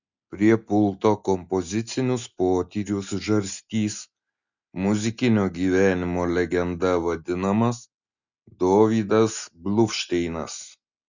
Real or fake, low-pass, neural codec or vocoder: real; 7.2 kHz; none